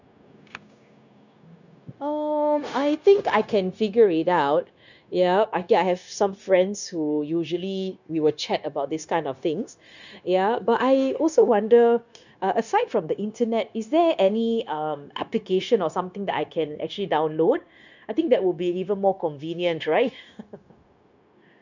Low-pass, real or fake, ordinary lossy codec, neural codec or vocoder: 7.2 kHz; fake; none; codec, 16 kHz, 0.9 kbps, LongCat-Audio-Codec